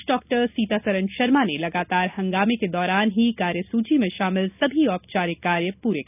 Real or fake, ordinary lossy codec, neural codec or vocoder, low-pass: real; none; none; 3.6 kHz